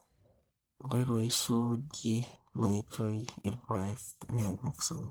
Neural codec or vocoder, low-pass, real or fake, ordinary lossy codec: codec, 44.1 kHz, 1.7 kbps, Pupu-Codec; none; fake; none